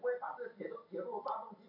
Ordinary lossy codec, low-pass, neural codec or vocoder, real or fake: MP3, 32 kbps; 5.4 kHz; none; real